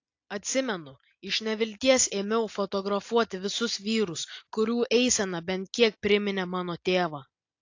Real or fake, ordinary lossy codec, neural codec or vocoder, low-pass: real; AAC, 48 kbps; none; 7.2 kHz